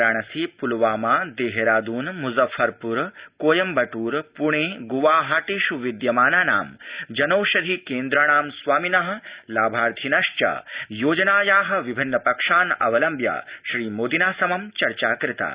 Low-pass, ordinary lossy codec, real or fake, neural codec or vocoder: 3.6 kHz; Opus, 64 kbps; real; none